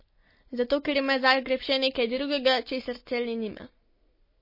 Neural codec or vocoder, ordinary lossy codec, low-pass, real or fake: none; MP3, 24 kbps; 5.4 kHz; real